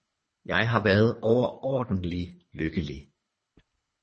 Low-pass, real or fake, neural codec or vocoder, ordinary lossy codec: 10.8 kHz; fake; codec, 24 kHz, 3 kbps, HILCodec; MP3, 32 kbps